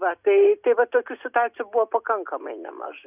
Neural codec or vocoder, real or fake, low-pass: none; real; 3.6 kHz